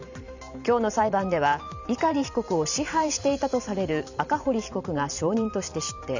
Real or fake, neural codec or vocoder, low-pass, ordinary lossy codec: fake; vocoder, 44.1 kHz, 128 mel bands every 512 samples, BigVGAN v2; 7.2 kHz; none